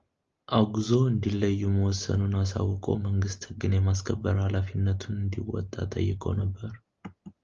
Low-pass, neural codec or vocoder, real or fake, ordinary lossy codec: 7.2 kHz; none; real; Opus, 32 kbps